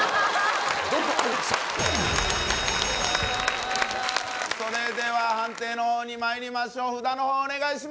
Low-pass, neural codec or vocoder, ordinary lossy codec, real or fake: none; none; none; real